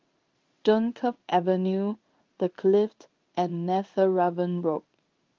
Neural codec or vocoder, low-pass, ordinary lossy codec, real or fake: codec, 16 kHz in and 24 kHz out, 1 kbps, XY-Tokenizer; 7.2 kHz; Opus, 32 kbps; fake